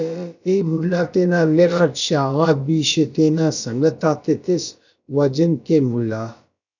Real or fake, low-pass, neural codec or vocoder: fake; 7.2 kHz; codec, 16 kHz, about 1 kbps, DyCAST, with the encoder's durations